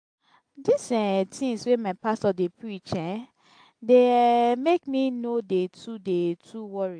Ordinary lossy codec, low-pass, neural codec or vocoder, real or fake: AAC, 64 kbps; 9.9 kHz; none; real